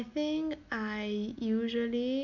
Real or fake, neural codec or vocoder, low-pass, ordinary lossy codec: real; none; 7.2 kHz; none